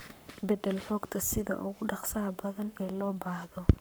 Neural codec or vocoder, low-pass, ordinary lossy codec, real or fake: codec, 44.1 kHz, 7.8 kbps, Pupu-Codec; none; none; fake